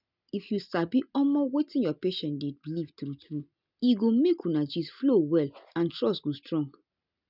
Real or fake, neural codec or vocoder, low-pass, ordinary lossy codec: real; none; 5.4 kHz; none